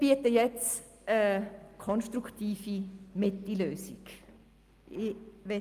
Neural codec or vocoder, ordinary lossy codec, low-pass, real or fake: none; Opus, 32 kbps; 14.4 kHz; real